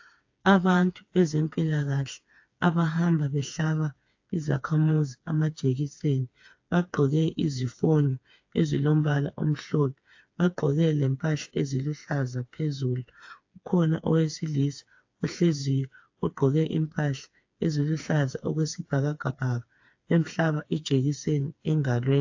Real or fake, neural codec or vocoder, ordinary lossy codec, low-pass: fake; codec, 16 kHz, 4 kbps, FreqCodec, smaller model; AAC, 48 kbps; 7.2 kHz